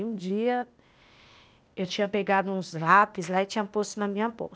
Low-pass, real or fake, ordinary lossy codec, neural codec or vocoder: none; fake; none; codec, 16 kHz, 0.8 kbps, ZipCodec